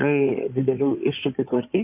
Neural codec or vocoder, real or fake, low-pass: codec, 16 kHz in and 24 kHz out, 2.2 kbps, FireRedTTS-2 codec; fake; 3.6 kHz